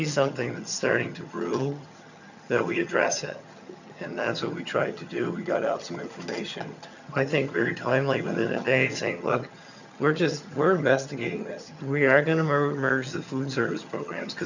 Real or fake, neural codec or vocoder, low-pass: fake; vocoder, 22.05 kHz, 80 mel bands, HiFi-GAN; 7.2 kHz